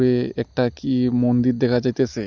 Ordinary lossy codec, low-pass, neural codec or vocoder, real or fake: none; 7.2 kHz; none; real